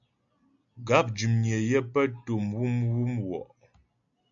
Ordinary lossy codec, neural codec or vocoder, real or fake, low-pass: AAC, 64 kbps; none; real; 7.2 kHz